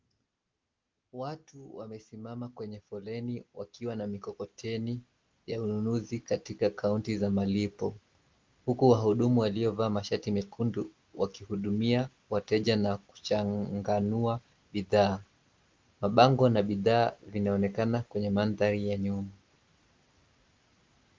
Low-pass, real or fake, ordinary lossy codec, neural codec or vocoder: 7.2 kHz; real; Opus, 32 kbps; none